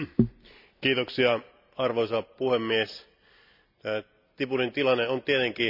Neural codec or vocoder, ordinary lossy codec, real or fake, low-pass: none; none; real; 5.4 kHz